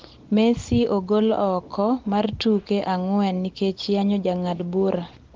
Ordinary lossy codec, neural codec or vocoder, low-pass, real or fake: Opus, 16 kbps; none; 7.2 kHz; real